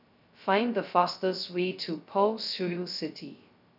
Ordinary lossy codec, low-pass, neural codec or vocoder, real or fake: none; 5.4 kHz; codec, 16 kHz, 0.2 kbps, FocalCodec; fake